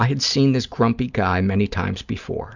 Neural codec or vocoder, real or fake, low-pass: none; real; 7.2 kHz